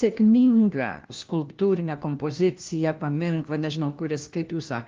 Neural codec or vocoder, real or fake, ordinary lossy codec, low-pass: codec, 16 kHz, 1 kbps, FunCodec, trained on LibriTTS, 50 frames a second; fake; Opus, 32 kbps; 7.2 kHz